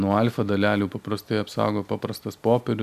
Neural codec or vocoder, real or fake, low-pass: none; real; 14.4 kHz